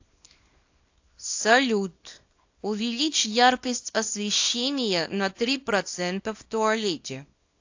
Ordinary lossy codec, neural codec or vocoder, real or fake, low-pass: AAC, 48 kbps; codec, 24 kHz, 0.9 kbps, WavTokenizer, small release; fake; 7.2 kHz